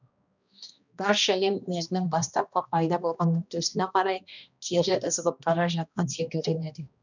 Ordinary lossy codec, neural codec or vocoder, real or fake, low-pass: none; codec, 16 kHz, 1 kbps, X-Codec, HuBERT features, trained on balanced general audio; fake; 7.2 kHz